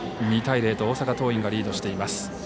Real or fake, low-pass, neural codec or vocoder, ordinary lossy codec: real; none; none; none